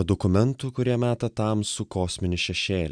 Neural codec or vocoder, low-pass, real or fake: none; 9.9 kHz; real